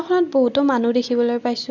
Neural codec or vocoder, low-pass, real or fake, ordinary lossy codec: none; 7.2 kHz; real; none